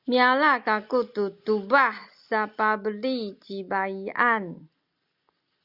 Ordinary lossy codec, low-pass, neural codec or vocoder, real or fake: Opus, 64 kbps; 5.4 kHz; none; real